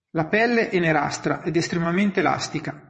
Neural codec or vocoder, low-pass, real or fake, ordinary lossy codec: vocoder, 22.05 kHz, 80 mel bands, WaveNeXt; 9.9 kHz; fake; MP3, 32 kbps